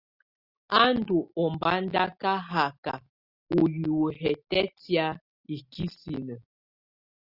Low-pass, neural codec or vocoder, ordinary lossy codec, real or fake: 5.4 kHz; none; Opus, 64 kbps; real